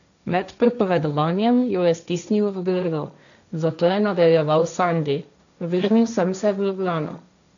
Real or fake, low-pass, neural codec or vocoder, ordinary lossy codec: fake; 7.2 kHz; codec, 16 kHz, 1.1 kbps, Voila-Tokenizer; none